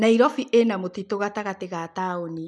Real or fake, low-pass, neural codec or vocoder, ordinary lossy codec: real; none; none; none